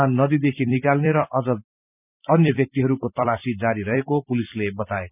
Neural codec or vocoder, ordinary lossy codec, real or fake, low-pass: vocoder, 44.1 kHz, 128 mel bands every 256 samples, BigVGAN v2; none; fake; 3.6 kHz